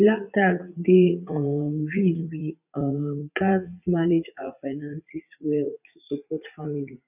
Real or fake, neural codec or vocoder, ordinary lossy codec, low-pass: fake; vocoder, 44.1 kHz, 80 mel bands, Vocos; none; 3.6 kHz